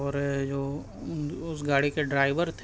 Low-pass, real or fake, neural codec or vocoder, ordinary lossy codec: none; real; none; none